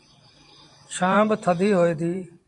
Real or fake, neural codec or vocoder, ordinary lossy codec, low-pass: fake; vocoder, 44.1 kHz, 128 mel bands every 512 samples, BigVGAN v2; MP3, 64 kbps; 10.8 kHz